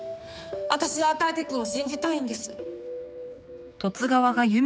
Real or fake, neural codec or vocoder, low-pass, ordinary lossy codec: fake; codec, 16 kHz, 4 kbps, X-Codec, HuBERT features, trained on general audio; none; none